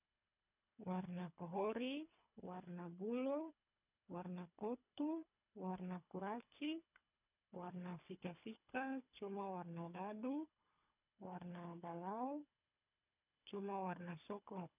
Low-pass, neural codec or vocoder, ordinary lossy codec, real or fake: 3.6 kHz; codec, 24 kHz, 3 kbps, HILCodec; none; fake